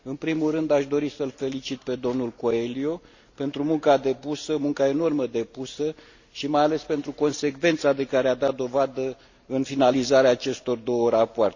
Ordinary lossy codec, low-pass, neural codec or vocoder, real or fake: Opus, 64 kbps; 7.2 kHz; none; real